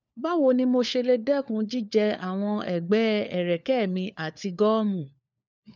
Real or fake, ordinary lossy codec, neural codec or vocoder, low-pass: fake; none; codec, 16 kHz, 4 kbps, FunCodec, trained on LibriTTS, 50 frames a second; 7.2 kHz